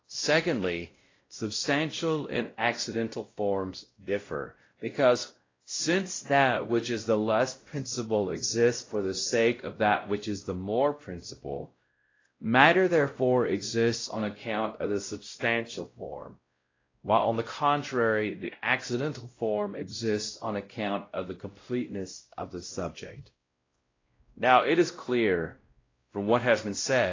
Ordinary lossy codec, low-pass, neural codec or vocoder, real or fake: AAC, 32 kbps; 7.2 kHz; codec, 16 kHz, 0.5 kbps, X-Codec, WavLM features, trained on Multilingual LibriSpeech; fake